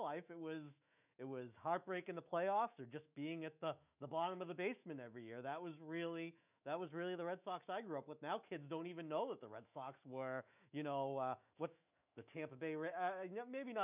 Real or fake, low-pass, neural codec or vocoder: fake; 3.6 kHz; autoencoder, 48 kHz, 128 numbers a frame, DAC-VAE, trained on Japanese speech